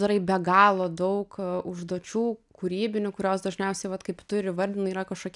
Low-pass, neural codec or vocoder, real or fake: 10.8 kHz; none; real